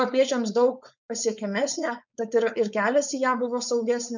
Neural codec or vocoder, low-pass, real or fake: codec, 16 kHz, 4.8 kbps, FACodec; 7.2 kHz; fake